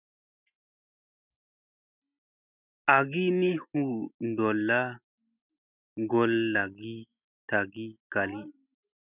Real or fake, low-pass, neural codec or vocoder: real; 3.6 kHz; none